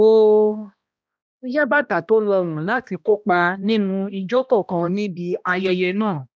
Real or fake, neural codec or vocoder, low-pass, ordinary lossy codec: fake; codec, 16 kHz, 1 kbps, X-Codec, HuBERT features, trained on balanced general audio; none; none